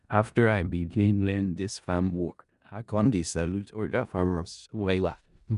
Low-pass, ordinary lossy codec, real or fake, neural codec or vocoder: 10.8 kHz; none; fake; codec, 16 kHz in and 24 kHz out, 0.4 kbps, LongCat-Audio-Codec, four codebook decoder